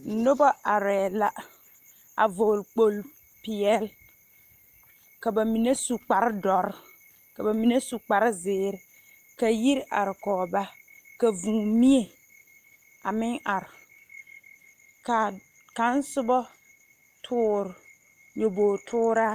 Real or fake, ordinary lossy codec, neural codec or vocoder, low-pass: real; Opus, 32 kbps; none; 14.4 kHz